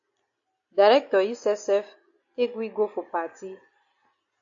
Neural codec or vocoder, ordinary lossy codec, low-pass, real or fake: none; AAC, 48 kbps; 7.2 kHz; real